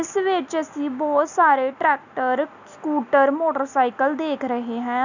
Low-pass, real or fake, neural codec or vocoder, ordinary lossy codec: 7.2 kHz; real; none; none